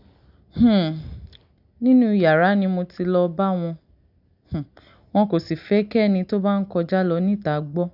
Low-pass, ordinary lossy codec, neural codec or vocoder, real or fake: 5.4 kHz; none; none; real